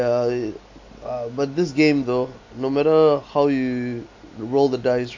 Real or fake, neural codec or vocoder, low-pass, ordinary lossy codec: real; none; 7.2 kHz; AAC, 48 kbps